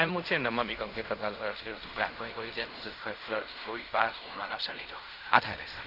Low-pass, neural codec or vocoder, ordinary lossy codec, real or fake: 5.4 kHz; codec, 16 kHz in and 24 kHz out, 0.4 kbps, LongCat-Audio-Codec, fine tuned four codebook decoder; none; fake